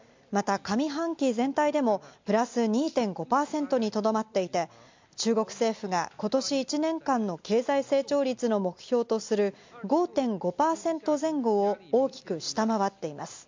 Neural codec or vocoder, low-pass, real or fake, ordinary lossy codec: none; 7.2 kHz; real; MP3, 64 kbps